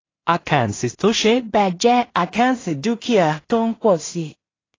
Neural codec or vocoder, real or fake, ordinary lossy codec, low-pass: codec, 16 kHz in and 24 kHz out, 0.4 kbps, LongCat-Audio-Codec, two codebook decoder; fake; AAC, 32 kbps; 7.2 kHz